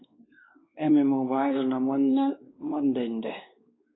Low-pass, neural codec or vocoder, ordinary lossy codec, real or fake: 7.2 kHz; codec, 16 kHz, 2 kbps, X-Codec, WavLM features, trained on Multilingual LibriSpeech; AAC, 16 kbps; fake